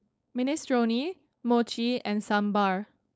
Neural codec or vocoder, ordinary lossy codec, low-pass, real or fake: codec, 16 kHz, 4.8 kbps, FACodec; none; none; fake